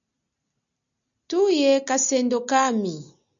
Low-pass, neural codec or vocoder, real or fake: 7.2 kHz; none; real